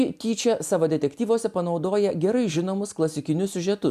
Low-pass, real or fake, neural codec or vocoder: 14.4 kHz; real; none